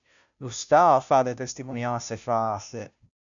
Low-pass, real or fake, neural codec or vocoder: 7.2 kHz; fake; codec, 16 kHz, 0.5 kbps, FunCodec, trained on Chinese and English, 25 frames a second